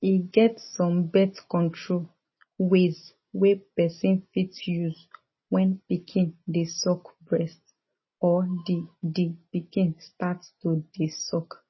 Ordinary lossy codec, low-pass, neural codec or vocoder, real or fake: MP3, 24 kbps; 7.2 kHz; none; real